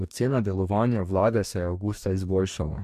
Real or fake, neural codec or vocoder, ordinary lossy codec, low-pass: fake; codec, 44.1 kHz, 2.6 kbps, DAC; MP3, 96 kbps; 14.4 kHz